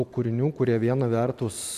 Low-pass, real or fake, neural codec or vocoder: 14.4 kHz; fake; vocoder, 44.1 kHz, 128 mel bands every 512 samples, BigVGAN v2